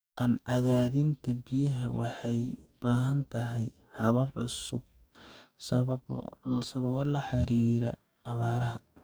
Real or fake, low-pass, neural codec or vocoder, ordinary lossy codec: fake; none; codec, 44.1 kHz, 2.6 kbps, DAC; none